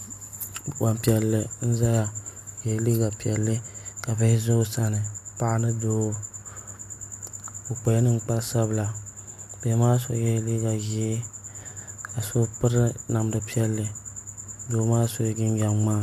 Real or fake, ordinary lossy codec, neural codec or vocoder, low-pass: real; AAC, 96 kbps; none; 14.4 kHz